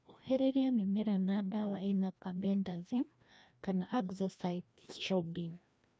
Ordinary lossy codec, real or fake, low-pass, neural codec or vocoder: none; fake; none; codec, 16 kHz, 1 kbps, FreqCodec, larger model